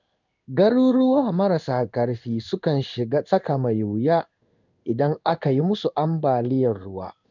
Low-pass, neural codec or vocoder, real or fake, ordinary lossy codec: 7.2 kHz; codec, 16 kHz in and 24 kHz out, 1 kbps, XY-Tokenizer; fake; none